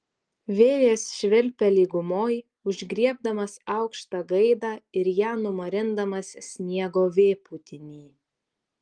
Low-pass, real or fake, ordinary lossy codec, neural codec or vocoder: 9.9 kHz; real; Opus, 24 kbps; none